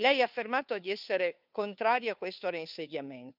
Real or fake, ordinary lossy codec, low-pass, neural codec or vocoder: fake; none; 5.4 kHz; codec, 16 kHz, 2 kbps, FunCodec, trained on Chinese and English, 25 frames a second